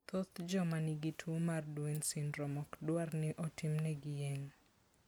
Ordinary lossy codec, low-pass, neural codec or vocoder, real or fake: none; none; none; real